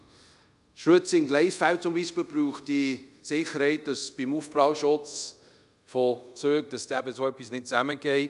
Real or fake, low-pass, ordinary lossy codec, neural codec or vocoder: fake; 10.8 kHz; none; codec, 24 kHz, 0.5 kbps, DualCodec